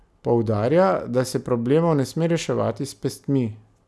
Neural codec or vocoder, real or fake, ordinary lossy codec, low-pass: none; real; none; none